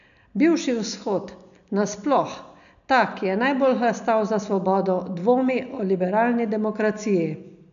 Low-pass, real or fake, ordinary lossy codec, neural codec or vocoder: 7.2 kHz; real; none; none